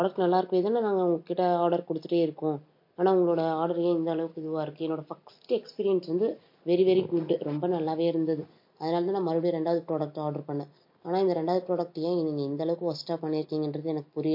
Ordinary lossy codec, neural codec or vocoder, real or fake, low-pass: MP3, 32 kbps; none; real; 5.4 kHz